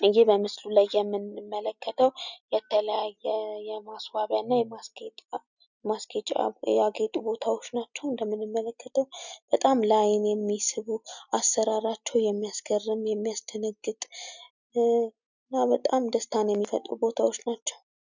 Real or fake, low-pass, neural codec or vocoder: real; 7.2 kHz; none